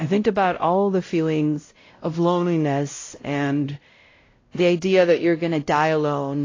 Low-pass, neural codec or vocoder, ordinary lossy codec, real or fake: 7.2 kHz; codec, 16 kHz, 0.5 kbps, X-Codec, WavLM features, trained on Multilingual LibriSpeech; AAC, 32 kbps; fake